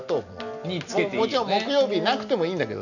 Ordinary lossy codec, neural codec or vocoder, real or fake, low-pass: none; none; real; 7.2 kHz